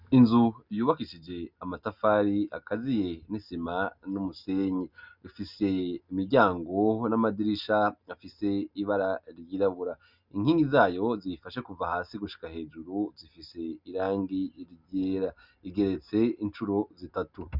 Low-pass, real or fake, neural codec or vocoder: 5.4 kHz; real; none